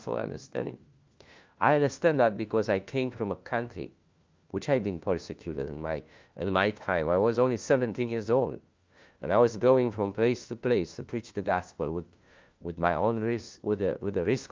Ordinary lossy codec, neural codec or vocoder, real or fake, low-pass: Opus, 24 kbps; codec, 16 kHz, 1 kbps, FunCodec, trained on LibriTTS, 50 frames a second; fake; 7.2 kHz